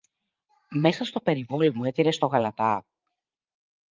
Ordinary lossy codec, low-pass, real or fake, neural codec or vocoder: Opus, 32 kbps; 7.2 kHz; fake; vocoder, 44.1 kHz, 80 mel bands, Vocos